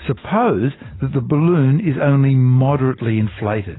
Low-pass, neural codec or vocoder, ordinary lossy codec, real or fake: 7.2 kHz; none; AAC, 16 kbps; real